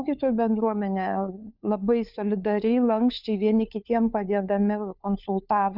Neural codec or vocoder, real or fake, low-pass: codec, 16 kHz, 4 kbps, FunCodec, trained on LibriTTS, 50 frames a second; fake; 5.4 kHz